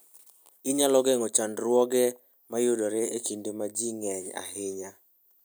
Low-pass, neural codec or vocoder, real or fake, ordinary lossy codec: none; none; real; none